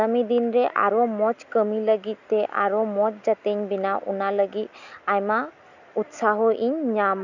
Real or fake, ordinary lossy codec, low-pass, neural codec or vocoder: real; none; 7.2 kHz; none